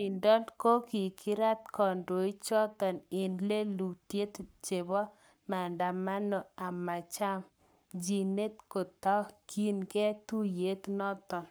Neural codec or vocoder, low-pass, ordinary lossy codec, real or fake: codec, 44.1 kHz, 7.8 kbps, Pupu-Codec; none; none; fake